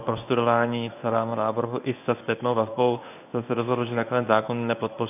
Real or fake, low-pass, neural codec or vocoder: fake; 3.6 kHz; codec, 24 kHz, 0.9 kbps, WavTokenizer, medium speech release version 1